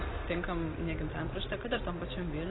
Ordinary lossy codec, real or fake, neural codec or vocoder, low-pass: AAC, 16 kbps; real; none; 7.2 kHz